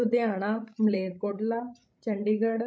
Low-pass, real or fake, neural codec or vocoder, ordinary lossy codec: none; fake; codec, 16 kHz, 16 kbps, FreqCodec, larger model; none